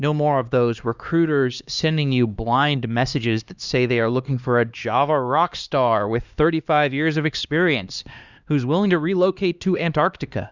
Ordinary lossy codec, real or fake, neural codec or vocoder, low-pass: Opus, 64 kbps; fake; codec, 16 kHz, 2 kbps, X-Codec, HuBERT features, trained on LibriSpeech; 7.2 kHz